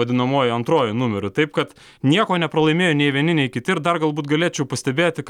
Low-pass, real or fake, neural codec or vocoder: 19.8 kHz; real; none